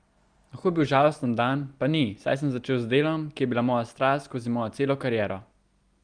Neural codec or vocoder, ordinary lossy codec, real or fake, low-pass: none; Opus, 32 kbps; real; 9.9 kHz